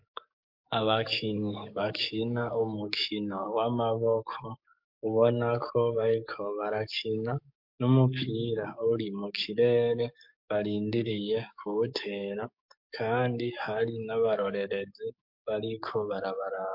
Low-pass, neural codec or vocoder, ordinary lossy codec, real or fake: 5.4 kHz; codec, 44.1 kHz, 7.8 kbps, DAC; MP3, 48 kbps; fake